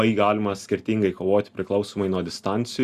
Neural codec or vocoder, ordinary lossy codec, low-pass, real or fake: none; Opus, 64 kbps; 14.4 kHz; real